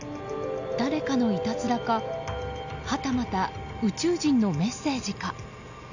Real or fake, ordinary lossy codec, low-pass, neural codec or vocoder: real; none; 7.2 kHz; none